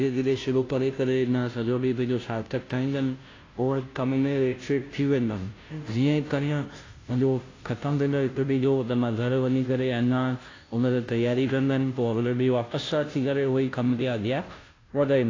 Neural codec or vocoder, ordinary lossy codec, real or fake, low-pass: codec, 16 kHz, 0.5 kbps, FunCodec, trained on Chinese and English, 25 frames a second; AAC, 32 kbps; fake; 7.2 kHz